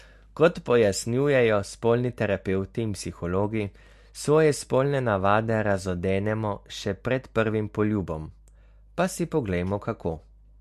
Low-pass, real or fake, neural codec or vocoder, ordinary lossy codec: 14.4 kHz; real; none; MP3, 64 kbps